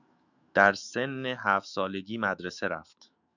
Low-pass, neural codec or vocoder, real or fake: 7.2 kHz; autoencoder, 48 kHz, 128 numbers a frame, DAC-VAE, trained on Japanese speech; fake